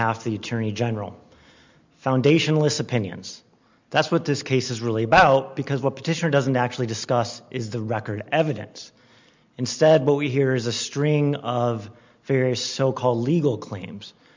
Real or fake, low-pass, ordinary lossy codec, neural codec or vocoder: real; 7.2 kHz; MP3, 64 kbps; none